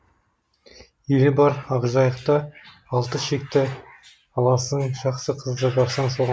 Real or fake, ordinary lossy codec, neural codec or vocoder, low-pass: real; none; none; none